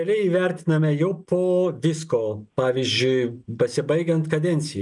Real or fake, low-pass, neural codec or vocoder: real; 10.8 kHz; none